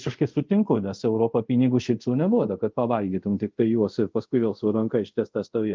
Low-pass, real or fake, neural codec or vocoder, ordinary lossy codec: 7.2 kHz; fake; codec, 24 kHz, 0.5 kbps, DualCodec; Opus, 24 kbps